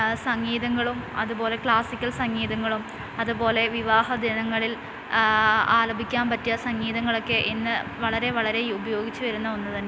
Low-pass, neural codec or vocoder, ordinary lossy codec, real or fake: none; none; none; real